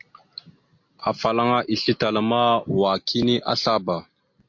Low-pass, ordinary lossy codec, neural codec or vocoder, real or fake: 7.2 kHz; MP3, 48 kbps; none; real